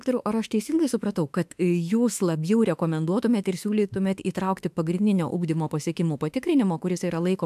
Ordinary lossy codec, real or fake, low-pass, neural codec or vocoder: AAC, 96 kbps; fake; 14.4 kHz; autoencoder, 48 kHz, 32 numbers a frame, DAC-VAE, trained on Japanese speech